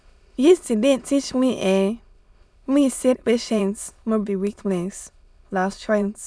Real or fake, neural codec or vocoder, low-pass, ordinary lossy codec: fake; autoencoder, 22.05 kHz, a latent of 192 numbers a frame, VITS, trained on many speakers; none; none